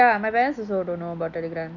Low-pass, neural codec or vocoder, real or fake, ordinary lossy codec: 7.2 kHz; none; real; none